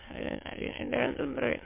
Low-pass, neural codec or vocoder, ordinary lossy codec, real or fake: 3.6 kHz; autoencoder, 22.05 kHz, a latent of 192 numbers a frame, VITS, trained on many speakers; MP3, 24 kbps; fake